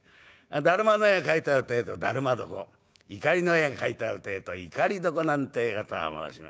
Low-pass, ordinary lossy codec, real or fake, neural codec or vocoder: none; none; fake; codec, 16 kHz, 6 kbps, DAC